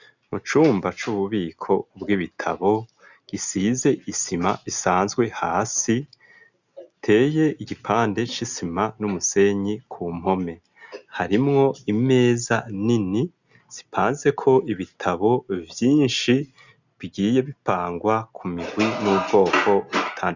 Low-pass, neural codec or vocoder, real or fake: 7.2 kHz; none; real